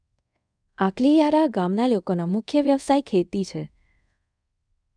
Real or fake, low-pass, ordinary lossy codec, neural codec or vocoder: fake; 9.9 kHz; none; codec, 24 kHz, 0.5 kbps, DualCodec